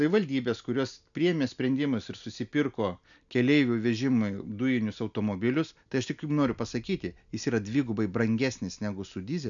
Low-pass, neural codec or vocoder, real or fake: 7.2 kHz; none; real